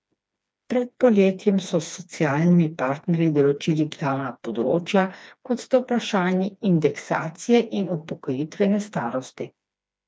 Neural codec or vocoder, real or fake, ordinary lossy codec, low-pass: codec, 16 kHz, 2 kbps, FreqCodec, smaller model; fake; none; none